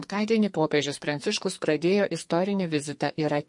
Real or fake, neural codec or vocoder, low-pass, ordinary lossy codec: fake; codec, 44.1 kHz, 2.6 kbps, SNAC; 10.8 kHz; MP3, 48 kbps